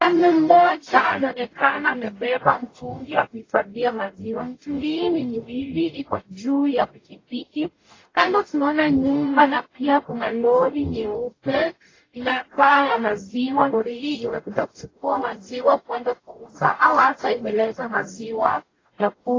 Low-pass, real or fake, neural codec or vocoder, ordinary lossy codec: 7.2 kHz; fake; codec, 44.1 kHz, 0.9 kbps, DAC; AAC, 32 kbps